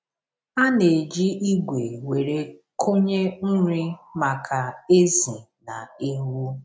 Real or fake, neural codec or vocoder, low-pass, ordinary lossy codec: real; none; none; none